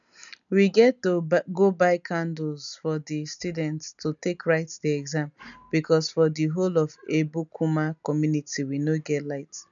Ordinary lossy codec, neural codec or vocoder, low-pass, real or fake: none; none; 7.2 kHz; real